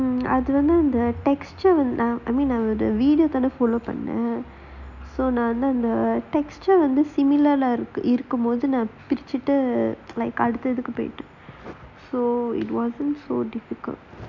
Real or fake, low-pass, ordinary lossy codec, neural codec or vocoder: real; 7.2 kHz; none; none